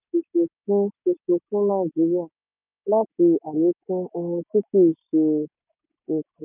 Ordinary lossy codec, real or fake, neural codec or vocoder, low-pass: none; real; none; 3.6 kHz